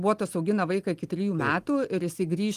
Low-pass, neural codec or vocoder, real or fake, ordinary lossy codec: 14.4 kHz; none; real; Opus, 24 kbps